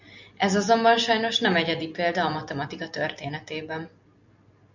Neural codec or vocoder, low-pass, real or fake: none; 7.2 kHz; real